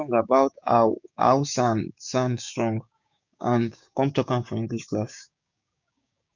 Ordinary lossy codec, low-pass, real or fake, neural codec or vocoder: none; 7.2 kHz; fake; codec, 16 kHz, 6 kbps, DAC